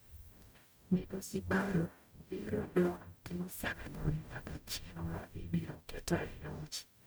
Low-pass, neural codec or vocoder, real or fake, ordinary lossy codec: none; codec, 44.1 kHz, 0.9 kbps, DAC; fake; none